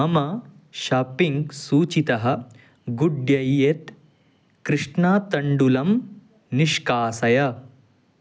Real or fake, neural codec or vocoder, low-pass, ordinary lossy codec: real; none; none; none